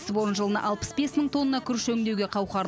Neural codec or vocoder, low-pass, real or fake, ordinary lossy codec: none; none; real; none